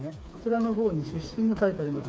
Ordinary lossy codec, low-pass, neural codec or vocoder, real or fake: none; none; codec, 16 kHz, 4 kbps, FreqCodec, smaller model; fake